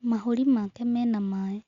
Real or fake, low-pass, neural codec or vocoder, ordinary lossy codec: real; 7.2 kHz; none; Opus, 64 kbps